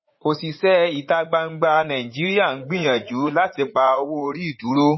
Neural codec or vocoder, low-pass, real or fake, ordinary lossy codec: vocoder, 44.1 kHz, 80 mel bands, Vocos; 7.2 kHz; fake; MP3, 24 kbps